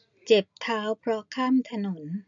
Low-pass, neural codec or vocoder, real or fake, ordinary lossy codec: 7.2 kHz; none; real; none